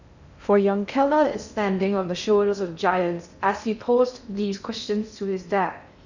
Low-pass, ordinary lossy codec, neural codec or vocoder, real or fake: 7.2 kHz; none; codec, 16 kHz in and 24 kHz out, 0.6 kbps, FocalCodec, streaming, 2048 codes; fake